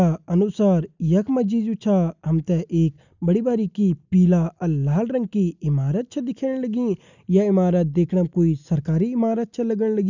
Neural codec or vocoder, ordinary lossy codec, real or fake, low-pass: none; none; real; 7.2 kHz